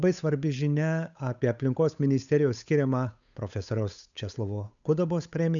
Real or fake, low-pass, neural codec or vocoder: fake; 7.2 kHz; codec, 16 kHz, 8 kbps, FunCodec, trained on Chinese and English, 25 frames a second